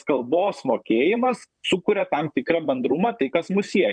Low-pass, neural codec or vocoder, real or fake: 9.9 kHz; vocoder, 44.1 kHz, 128 mel bands, Pupu-Vocoder; fake